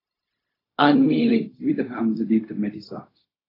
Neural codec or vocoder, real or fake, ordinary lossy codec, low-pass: codec, 16 kHz, 0.4 kbps, LongCat-Audio-Codec; fake; AAC, 24 kbps; 5.4 kHz